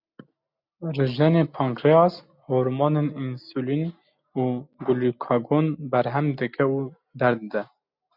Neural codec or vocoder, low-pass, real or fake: none; 5.4 kHz; real